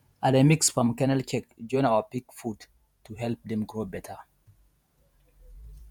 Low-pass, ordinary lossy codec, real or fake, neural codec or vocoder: 19.8 kHz; none; fake; vocoder, 48 kHz, 128 mel bands, Vocos